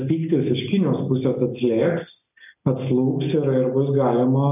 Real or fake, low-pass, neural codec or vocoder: real; 3.6 kHz; none